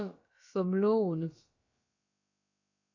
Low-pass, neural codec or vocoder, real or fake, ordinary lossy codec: 7.2 kHz; codec, 16 kHz, about 1 kbps, DyCAST, with the encoder's durations; fake; MP3, 48 kbps